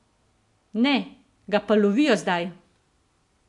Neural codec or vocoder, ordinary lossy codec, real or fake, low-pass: none; MP3, 64 kbps; real; 10.8 kHz